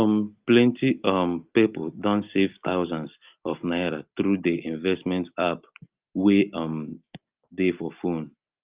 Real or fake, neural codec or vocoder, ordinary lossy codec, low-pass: real; none; Opus, 32 kbps; 3.6 kHz